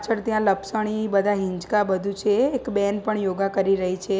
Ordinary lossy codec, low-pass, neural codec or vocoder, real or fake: none; none; none; real